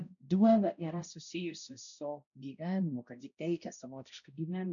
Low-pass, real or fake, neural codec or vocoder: 7.2 kHz; fake; codec, 16 kHz, 0.5 kbps, X-Codec, HuBERT features, trained on balanced general audio